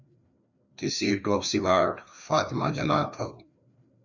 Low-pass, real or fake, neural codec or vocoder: 7.2 kHz; fake; codec, 16 kHz, 2 kbps, FreqCodec, larger model